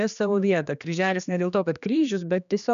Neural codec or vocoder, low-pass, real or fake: codec, 16 kHz, 2 kbps, X-Codec, HuBERT features, trained on general audio; 7.2 kHz; fake